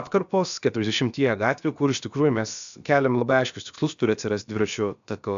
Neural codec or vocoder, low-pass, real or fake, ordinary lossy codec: codec, 16 kHz, about 1 kbps, DyCAST, with the encoder's durations; 7.2 kHz; fake; AAC, 96 kbps